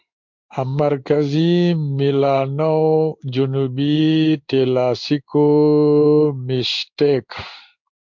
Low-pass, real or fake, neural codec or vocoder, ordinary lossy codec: 7.2 kHz; fake; codec, 16 kHz in and 24 kHz out, 1 kbps, XY-Tokenizer; MP3, 64 kbps